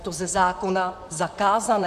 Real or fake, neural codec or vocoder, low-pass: fake; vocoder, 44.1 kHz, 128 mel bands, Pupu-Vocoder; 14.4 kHz